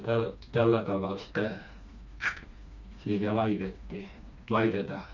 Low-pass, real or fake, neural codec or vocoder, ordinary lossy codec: 7.2 kHz; fake; codec, 16 kHz, 2 kbps, FreqCodec, smaller model; none